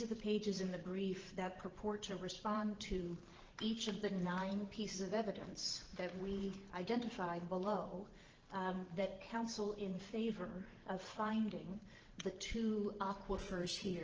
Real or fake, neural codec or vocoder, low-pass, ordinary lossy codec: fake; vocoder, 44.1 kHz, 128 mel bands every 512 samples, BigVGAN v2; 7.2 kHz; Opus, 16 kbps